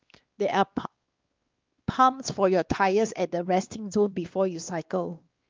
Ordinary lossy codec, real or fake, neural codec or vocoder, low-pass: Opus, 32 kbps; fake; codec, 16 kHz, 2 kbps, X-Codec, WavLM features, trained on Multilingual LibriSpeech; 7.2 kHz